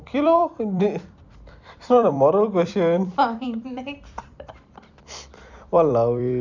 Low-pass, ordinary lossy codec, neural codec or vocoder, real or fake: 7.2 kHz; none; vocoder, 44.1 kHz, 128 mel bands every 256 samples, BigVGAN v2; fake